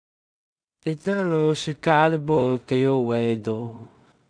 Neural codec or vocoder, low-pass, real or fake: codec, 16 kHz in and 24 kHz out, 0.4 kbps, LongCat-Audio-Codec, two codebook decoder; 9.9 kHz; fake